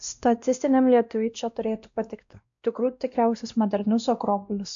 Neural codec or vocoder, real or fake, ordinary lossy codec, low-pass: codec, 16 kHz, 1 kbps, X-Codec, WavLM features, trained on Multilingual LibriSpeech; fake; AAC, 64 kbps; 7.2 kHz